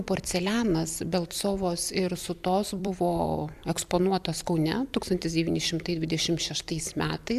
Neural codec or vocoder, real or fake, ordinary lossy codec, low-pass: vocoder, 44.1 kHz, 128 mel bands every 256 samples, BigVGAN v2; fake; AAC, 96 kbps; 14.4 kHz